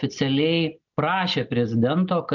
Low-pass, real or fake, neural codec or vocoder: 7.2 kHz; real; none